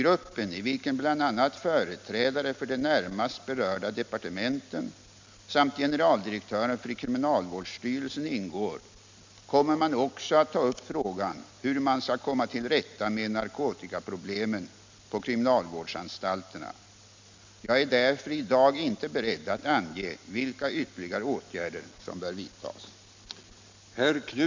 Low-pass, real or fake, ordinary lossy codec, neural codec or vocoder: 7.2 kHz; real; MP3, 64 kbps; none